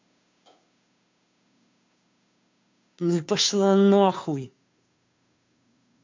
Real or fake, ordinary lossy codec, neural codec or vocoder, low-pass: fake; MP3, 64 kbps; codec, 16 kHz, 2 kbps, FunCodec, trained on Chinese and English, 25 frames a second; 7.2 kHz